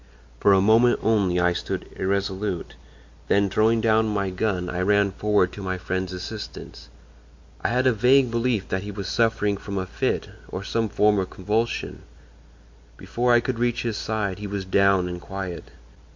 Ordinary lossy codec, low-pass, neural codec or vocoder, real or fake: MP3, 48 kbps; 7.2 kHz; none; real